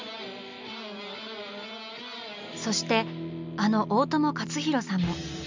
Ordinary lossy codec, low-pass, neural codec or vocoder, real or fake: MP3, 64 kbps; 7.2 kHz; none; real